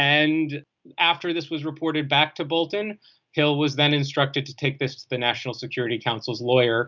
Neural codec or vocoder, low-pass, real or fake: none; 7.2 kHz; real